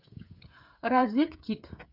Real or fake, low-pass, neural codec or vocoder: fake; 5.4 kHz; codec, 16 kHz, 8 kbps, FreqCodec, smaller model